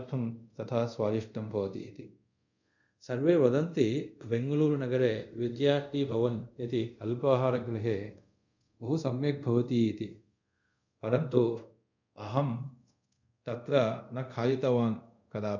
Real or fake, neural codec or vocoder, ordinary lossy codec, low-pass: fake; codec, 24 kHz, 0.5 kbps, DualCodec; none; 7.2 kHz